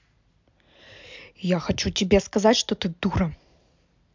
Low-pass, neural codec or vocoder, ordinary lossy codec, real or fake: 7.2 kHz; none; MP3, 64 kbps; real